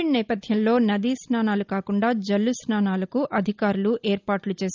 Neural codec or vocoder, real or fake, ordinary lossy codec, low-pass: none; real; Opus, 24 kbps; 7.2 kHz